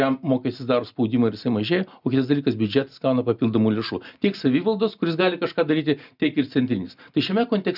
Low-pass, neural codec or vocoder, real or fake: 5.4 kHz; none; real